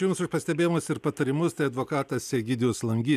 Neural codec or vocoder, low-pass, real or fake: none; 14.4 kHz; real